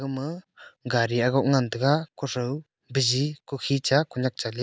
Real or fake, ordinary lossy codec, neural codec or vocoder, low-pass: real; none; none; none